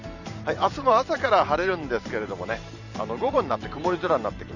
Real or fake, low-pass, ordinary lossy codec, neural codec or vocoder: fake; 7.2 kHz; none; vocoder, 44.1 kHz, 128 mel bands every 512 samples, BigVGAN v2